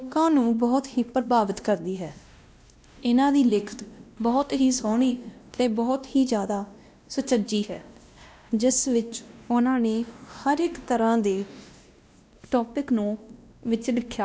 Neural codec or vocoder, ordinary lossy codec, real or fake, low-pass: codec, 16 kHz, 1 kbps, X-Codec, WavLM features, trained on Multilingual LibriSpeech; none; fake; none